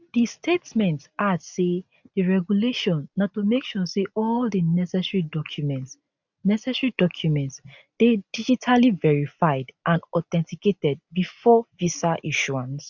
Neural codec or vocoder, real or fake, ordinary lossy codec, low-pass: none; real; none; 7.2 kHz